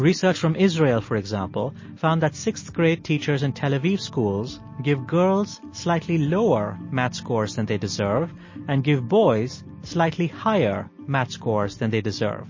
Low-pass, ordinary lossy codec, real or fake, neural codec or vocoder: 7.2 kHz; MP3, 32 kbps; real; none